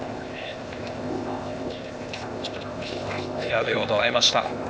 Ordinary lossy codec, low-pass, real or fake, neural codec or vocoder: none; none; fake; codec, 16 kHz, 0.8 kbps, ZipCodec